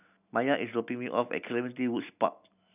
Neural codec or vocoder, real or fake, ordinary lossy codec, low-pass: none; real; none; 3.6 kHz